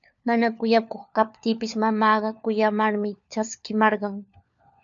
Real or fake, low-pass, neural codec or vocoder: fake; 7.2 kHz; codec, 16 kHz, 4 kbps, FunCodec, trained on LibriTTS, 50 frames a second